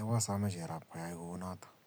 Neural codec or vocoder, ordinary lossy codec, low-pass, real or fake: none; none; none; real